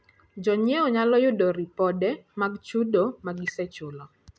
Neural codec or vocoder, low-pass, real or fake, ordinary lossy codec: none; none; real; none